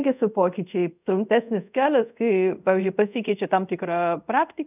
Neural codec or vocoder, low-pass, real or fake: codec, 24 kHz, 0.5 kbps, DualCodec; 3.6 kHz; fake